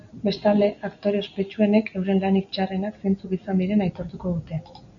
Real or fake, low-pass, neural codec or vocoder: real; 7.2 kHz; none